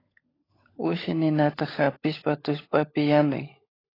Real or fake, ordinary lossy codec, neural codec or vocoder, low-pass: fake; AAC, 24 kbps; codec, 16 kHz, 16 kbps, FunCodec, trained on LibriTTS, 50 frames a second; 5.4 kHz